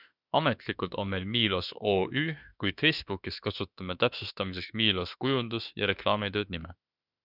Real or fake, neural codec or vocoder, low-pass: fake; autoencoder, 48 kHz, 32 numbers a frame, DAC-VAE, trained on Japanese speech; 5.4 kHz